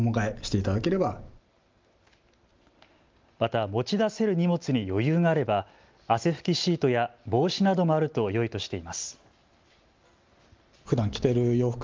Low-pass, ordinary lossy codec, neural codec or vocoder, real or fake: 7.2 kHz; Opus, 32 kbps; none; real